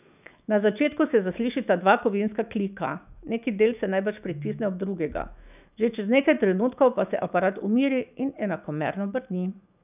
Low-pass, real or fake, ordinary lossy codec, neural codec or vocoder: 3.6 kHz; fake; none; autoencoder, 48 kHz, 128 numbers a frame, DAC-VAE, trained on Japanese speech